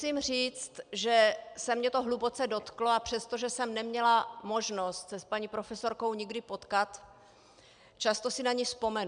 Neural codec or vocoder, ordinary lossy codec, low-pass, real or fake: none; MP3, 96 kbps; 9.9 kHz; real